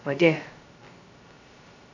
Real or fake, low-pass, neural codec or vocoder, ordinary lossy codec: fake; 7.2 kHz; codec, 16 kHz, 0.2 kbps, FocalCodec; AAC, 32 kbps